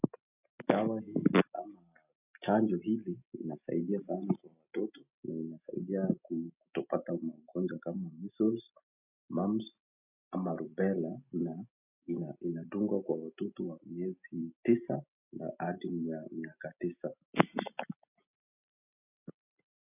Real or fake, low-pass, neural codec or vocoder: real; 3.6 kHz; none